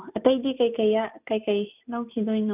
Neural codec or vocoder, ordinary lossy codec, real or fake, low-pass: none; none; real; 3.6 kHz